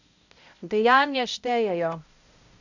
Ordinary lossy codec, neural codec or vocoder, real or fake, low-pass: none; codec, 16 kHz, 0.5 kbps, X-Codec, HuBERT features, trained on balanced general audio; fake; 7.2 kHz